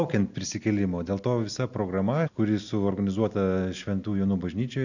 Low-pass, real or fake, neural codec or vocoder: 7.2 kHz; real; none